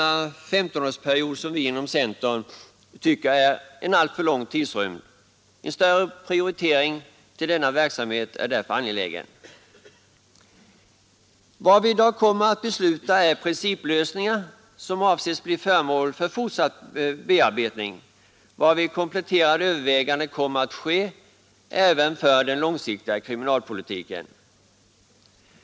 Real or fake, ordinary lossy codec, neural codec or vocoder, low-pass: real; none; none; none